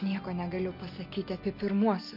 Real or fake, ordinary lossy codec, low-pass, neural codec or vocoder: real; MP3, 32 kbps; 5.4 kHz; none